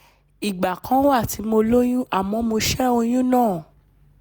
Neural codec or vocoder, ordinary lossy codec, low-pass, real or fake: none; none; none; real